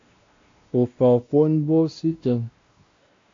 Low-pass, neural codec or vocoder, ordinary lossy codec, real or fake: 7.2 kHz; codec, 16 kHz, 1 kbps, X-Codec, WavLM features, trained on Multilingual LibriSpeech; AAC, 48 kbps; fake